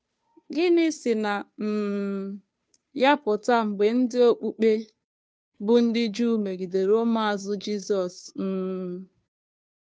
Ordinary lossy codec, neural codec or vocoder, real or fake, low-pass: none; codec, 16 kHz, 2 kbps, FunCodec, trained on Chinese and English, 25 frames a second; fake; none